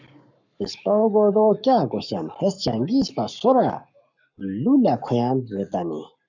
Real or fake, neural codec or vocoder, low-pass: fake; codec, 44.1 kHz, 7.8 kbps, Pupu-Codec; 7.2 kHz